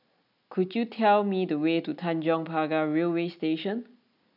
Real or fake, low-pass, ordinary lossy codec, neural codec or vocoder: real; 5.4 kHz; none; none